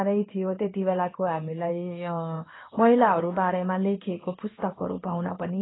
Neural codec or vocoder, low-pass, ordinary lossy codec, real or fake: codec, 16 kHz in and 24 kHz out, 1 kbps, XY-Tokenizer; 7.2 kHz; AAC, 16 kbps; fake